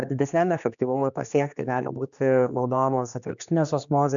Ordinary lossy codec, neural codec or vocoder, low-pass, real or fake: MP3, 96 kbps; codec, 16 kHz, 2 kbps, X-Codec, HuBERT features, trained on general audio; 7.2 kHz; fake